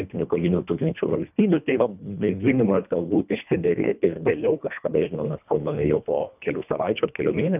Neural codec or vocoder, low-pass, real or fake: codec, 24 kHz, 1.5 kbps, HILCodec; 3.6 kHz; fake